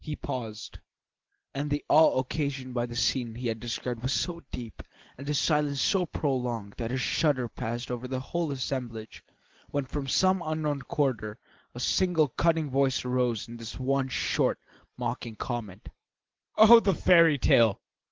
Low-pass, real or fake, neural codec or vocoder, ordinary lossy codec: 7.2 kHz; real; none; Opus, 16 kbps